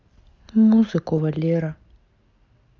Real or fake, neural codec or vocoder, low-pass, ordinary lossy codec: real; none; 7.2 kHz; Opus, 32 kbps